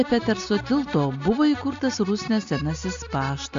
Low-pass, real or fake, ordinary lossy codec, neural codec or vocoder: 7.2 kHz; real; AAC, 64 kbps; none